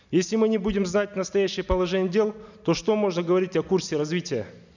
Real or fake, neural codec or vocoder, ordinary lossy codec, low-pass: real; none; none; 7.2 kHz